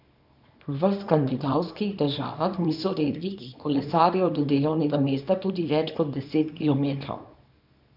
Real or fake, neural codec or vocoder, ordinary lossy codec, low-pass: fake; codec, 24 kHz, 0.9 kbps, WavTokenizer, small release; none; 5.4 kHz